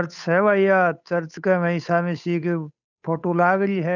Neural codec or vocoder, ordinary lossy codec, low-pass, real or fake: codec, 16 kHz, 8 kbps, FunCodec, trained on Chinese and English, 25 frames a second; none; 7.2 kHz; fake